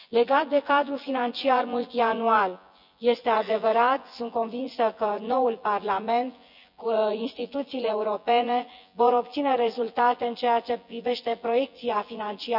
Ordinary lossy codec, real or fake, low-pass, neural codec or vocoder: none; fake; 5.4 kHz; vocoder, 24 kHz, 100 mel bands, Vocos